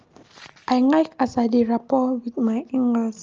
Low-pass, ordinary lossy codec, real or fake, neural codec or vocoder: 7.2 kHz; Opus, 24 kbps; real; none